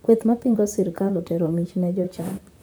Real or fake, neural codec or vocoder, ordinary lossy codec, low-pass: fake; vocoder, 44.1 kHz, 128 mel bands, Pupu-Vocoder; none; none